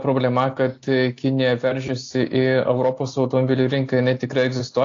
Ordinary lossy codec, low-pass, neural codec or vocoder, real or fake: AAC, 48 kbps; 7.2 kHz; none; real